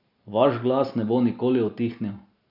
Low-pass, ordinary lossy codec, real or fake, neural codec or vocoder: 5.4 kHz; none; real; none